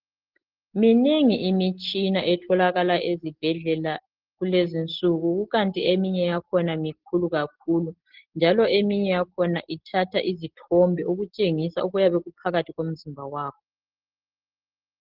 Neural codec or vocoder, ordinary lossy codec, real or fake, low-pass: none; Opus, 16 kbps; real; 5.4 kHz